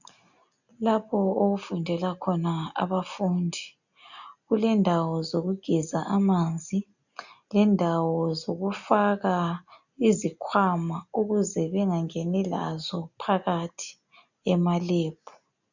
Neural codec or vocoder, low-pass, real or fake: none; 7.2 kHz; real